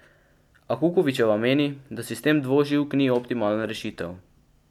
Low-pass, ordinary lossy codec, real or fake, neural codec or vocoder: 19.8 kHz; none; real; none